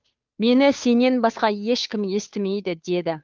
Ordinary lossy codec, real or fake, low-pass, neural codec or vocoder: Opus, 24 kbps; fake; 7.2 kHz; codec, 16 kHz, 2 kbps, FunCodec, trained on Chinese and English, 25 frames a second